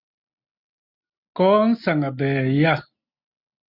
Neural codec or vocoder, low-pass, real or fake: none; 5.4 kHz; real